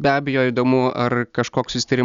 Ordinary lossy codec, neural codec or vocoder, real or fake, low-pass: Opus, 64 kbps; none; real; 7.2 kHz